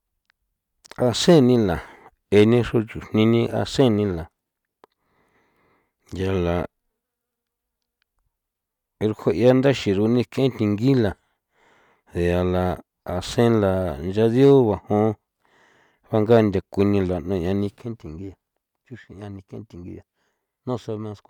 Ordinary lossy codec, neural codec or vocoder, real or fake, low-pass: none; none; real; 19.8 kHz